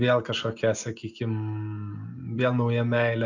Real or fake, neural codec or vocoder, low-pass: real; none; 7.2 kHz